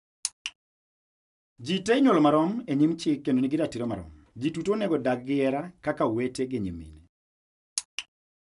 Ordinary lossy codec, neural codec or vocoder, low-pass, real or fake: none; none; 10.8 kHz; real